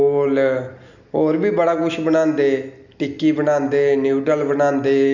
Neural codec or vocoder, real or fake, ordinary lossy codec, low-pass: none; real; none; 7.2 kHz